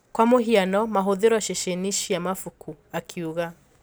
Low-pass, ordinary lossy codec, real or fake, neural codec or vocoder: none; none; real; none